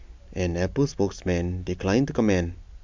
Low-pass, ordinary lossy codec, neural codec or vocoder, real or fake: 7.2 kHz; MP3, 64 kbps; none; real